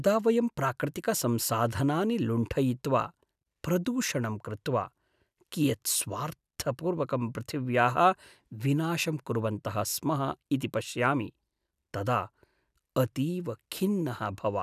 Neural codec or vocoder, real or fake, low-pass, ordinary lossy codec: none; real; 14.4 kHz; none